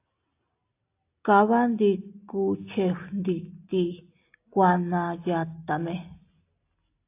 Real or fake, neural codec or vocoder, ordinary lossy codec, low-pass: real; none; AAC, 24 kbps; 3.6 kHz